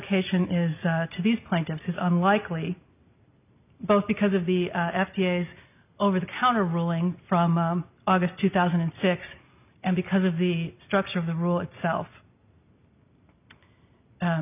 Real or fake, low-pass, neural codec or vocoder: real; 3.6 kHz; none